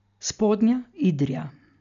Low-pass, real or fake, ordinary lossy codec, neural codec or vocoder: 7.2 kHz; real; none; none